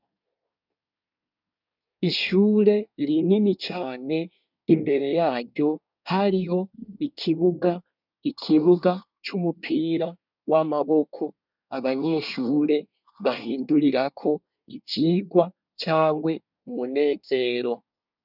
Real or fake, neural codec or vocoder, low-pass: fake; codec, 24 kHz, 1 kbps, SNAC; 5.4 kHz